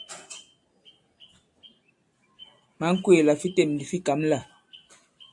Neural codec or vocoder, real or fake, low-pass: none; real; 10.8 kHz